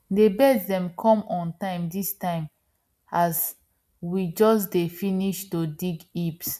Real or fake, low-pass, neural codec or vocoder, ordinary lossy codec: real; 14.4 kHz; none; none